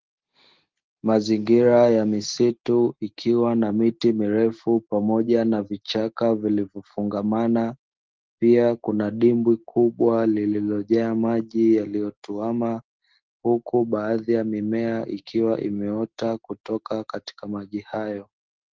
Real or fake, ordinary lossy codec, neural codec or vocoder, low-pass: real; Opus, 16 kbps; none; 7.2 kHz